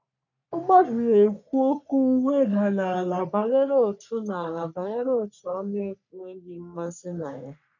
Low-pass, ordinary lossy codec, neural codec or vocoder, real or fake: 7.2 kHz; none; codec, 44.1 kHz, 3.4 kbps, Pupu-Codec; fake